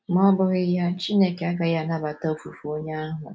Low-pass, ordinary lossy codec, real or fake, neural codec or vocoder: none; none; real; none